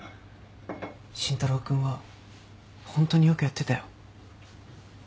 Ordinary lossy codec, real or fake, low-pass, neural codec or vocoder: none; real; none; none